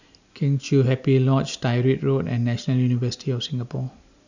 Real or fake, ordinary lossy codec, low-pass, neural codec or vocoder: real; none; 7.2 kHz; none